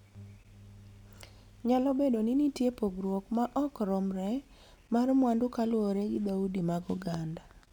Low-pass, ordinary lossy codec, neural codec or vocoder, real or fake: 19.8 kHz; none; none; real